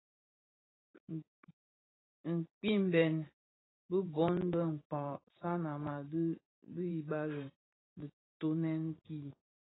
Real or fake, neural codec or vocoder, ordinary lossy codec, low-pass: fake; vocoder, 44.1 kHz, 128 mel bands every 512 samples, BigVGAN v2; AAC, 16 kbps; 7.2 kHz